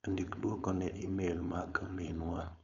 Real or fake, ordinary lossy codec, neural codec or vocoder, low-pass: fake; none; codec, 16 kHz, 4.8 kbps, FACodec; 7.2 kHz